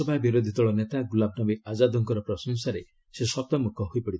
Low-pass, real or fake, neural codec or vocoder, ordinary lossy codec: none; real; none; none